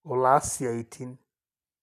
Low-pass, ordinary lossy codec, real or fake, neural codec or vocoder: 14.4 kHz; none; real; none